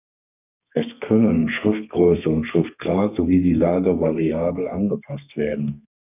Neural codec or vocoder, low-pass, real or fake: codec, 32 kHz, 1.9 kbps, SNAC; 3.6 kHz; fake